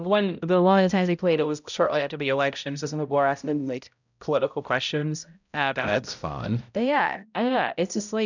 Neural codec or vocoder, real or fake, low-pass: codec, 16 kHz, 0.5 kbps, X-Codec, HuBERT features, trained on balanced general audio; fake; 7.2 kHz